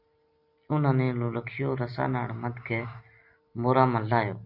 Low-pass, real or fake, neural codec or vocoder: 5.4 kHz; real; none